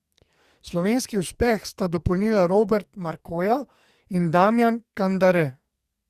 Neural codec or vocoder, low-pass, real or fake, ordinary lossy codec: codec, 44.1 kHz, 2.6 kbps, SNAC; 14.4 kHz; fake; Opus, 64 kbps